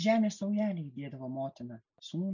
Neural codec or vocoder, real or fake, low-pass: none; real; 7.2 kHz